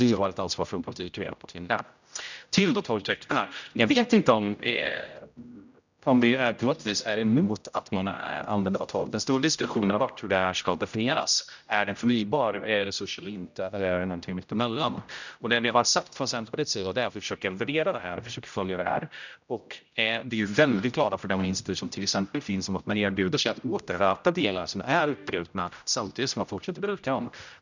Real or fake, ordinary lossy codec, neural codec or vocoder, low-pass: fake; none; codec, 16 kHz, 0.5 kbps, X-Codec, HuBERT features, trained on general audio; 7.2 kHz